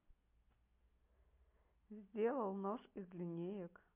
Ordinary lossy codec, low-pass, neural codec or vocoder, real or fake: MP3, 24 kbps; 3.6 kHz; none; real